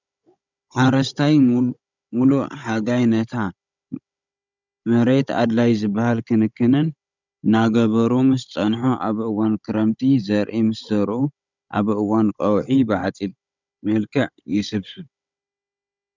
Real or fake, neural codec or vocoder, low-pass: fake; codec, 16 kHz, 16 kbps, FunCodec, trained on Chinese and English, 50 frames a second; 7.2 kHz